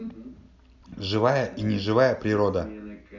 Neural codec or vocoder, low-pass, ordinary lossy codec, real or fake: none; 7.2 kHz; none; real